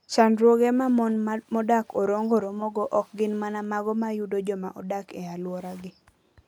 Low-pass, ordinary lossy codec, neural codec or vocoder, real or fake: 19.8 kHz; none; none; real